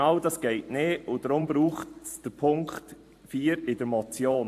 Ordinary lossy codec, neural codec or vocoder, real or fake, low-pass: AAC, 64 kbps; vocoder, 44.1 kHz, 128 mel bands every 512 samples, BigVGAN v2; fake; 14.4 kHz